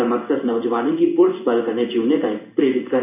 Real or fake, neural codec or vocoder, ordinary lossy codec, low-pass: fake; codec, 16 kHz in and 24 kHz out, 1 kbps, XY-Tokenizer; none; 3.6 kHz